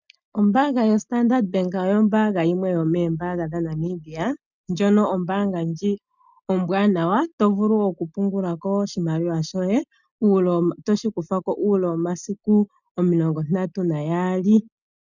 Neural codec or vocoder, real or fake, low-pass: none; real; 7.2 kHz